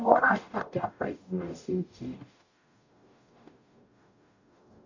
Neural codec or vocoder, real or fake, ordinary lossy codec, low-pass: codec, 44.1 kHz, 0.9 kbps, DAC; fake; none; 7.2 kHz